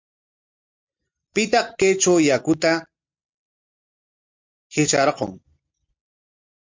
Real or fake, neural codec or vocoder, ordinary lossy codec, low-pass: real; none; MP3, 64 kbps; 7.2 kHz